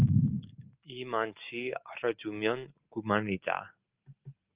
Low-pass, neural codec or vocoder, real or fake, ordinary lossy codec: 3.6 kHz; none; real; Opus, 32 kbps